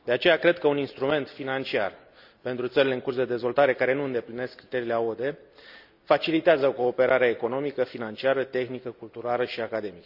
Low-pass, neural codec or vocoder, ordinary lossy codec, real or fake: 5.4 kHz; none; none; real